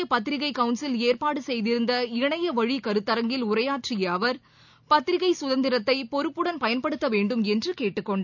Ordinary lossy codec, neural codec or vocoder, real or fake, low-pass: none; none; real; 7.2 kHz